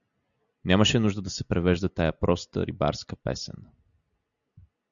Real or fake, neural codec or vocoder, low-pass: real; none; 7.2 kHz